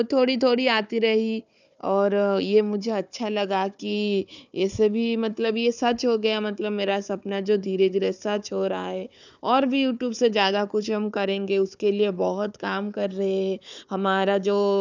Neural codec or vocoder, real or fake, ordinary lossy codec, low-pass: codec, 16 kHz, 4 kbps, FunCodec, trained on Chinese and English, 50 frames a second; fake; none; 7.2 kHz